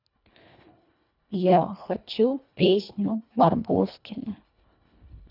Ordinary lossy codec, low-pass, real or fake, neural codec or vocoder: none; 5.4 kHz; fake; codec, 24 kHz, 1.5 kbps, HILCodec